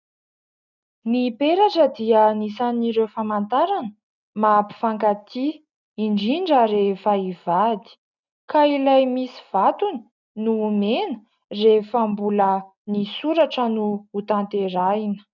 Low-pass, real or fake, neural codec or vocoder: 7.2 kHz; real; none